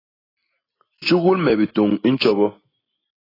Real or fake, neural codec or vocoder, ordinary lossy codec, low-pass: real; none; AAC, 24 kbps; 5.4 kHz